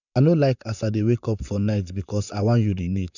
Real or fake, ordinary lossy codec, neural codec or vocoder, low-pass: fake; none; vocoder, 44.1 kHz, 128 mel bands, Pupu-Vocoder; 7.2 kHz